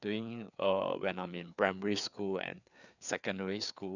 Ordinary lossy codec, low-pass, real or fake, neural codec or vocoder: none; 7.2 kHz; fake; codec, 16 kHz, 4 kbps, FreqCodec, larger model